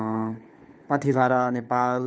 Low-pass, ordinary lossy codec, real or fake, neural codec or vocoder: none; none; fake; codec, 16 kHz, 4 kbps, FunCodec, trained on LibriTTS, 50 frames a second